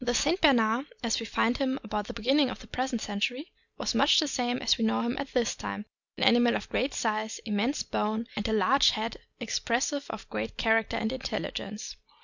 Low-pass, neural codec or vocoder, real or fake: 7.2 kHz; none; real